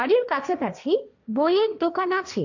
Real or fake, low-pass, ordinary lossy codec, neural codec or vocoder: fake; 7.2 kHz; none; codec, 16 kHz, 1.1 kbps, Voila-Tokenizer